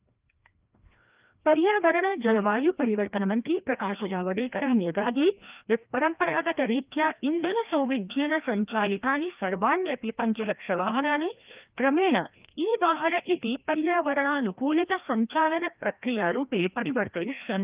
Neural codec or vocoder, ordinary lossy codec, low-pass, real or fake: codec, 16 kHz, 1 kbps, FreqCodec, larger model; Opus, 24 kbps; 3.6 kHz; fake